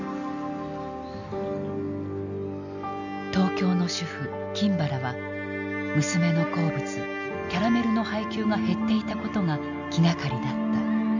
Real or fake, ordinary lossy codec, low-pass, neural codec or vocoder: real; none; 7.2 kHz; none